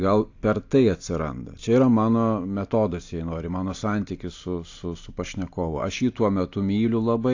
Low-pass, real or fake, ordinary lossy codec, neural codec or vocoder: 7.2 kHz; real; AAC, 48 kbps; none